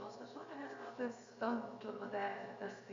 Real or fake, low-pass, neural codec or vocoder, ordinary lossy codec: fake; 7.2 kHz; codec, 16 kHz, 0.7 kbps, FocalCodec; Opus, 64 kbps